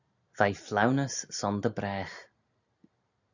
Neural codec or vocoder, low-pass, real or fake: none; 7.2 kHz; real